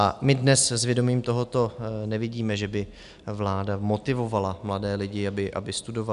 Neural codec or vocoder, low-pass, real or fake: none; 10.8 kHz; real